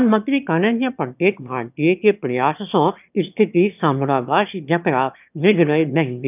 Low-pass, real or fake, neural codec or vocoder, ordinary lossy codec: 3.6 kHz; fake; autoencoder, 22.05 kHz, a latent of 192 numbers a frame, VITS, trained on one speaker; none